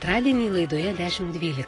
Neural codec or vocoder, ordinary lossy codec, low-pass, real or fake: none; AAC, 32 kbps; 10.8 kHz; real